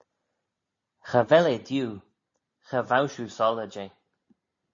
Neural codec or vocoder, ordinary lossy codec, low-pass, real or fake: none; MP3, 32 kbps; 7.2 kHz; real